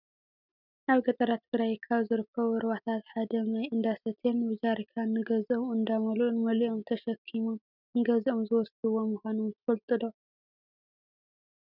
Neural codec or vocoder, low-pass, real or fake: none; 5.4 kHz; real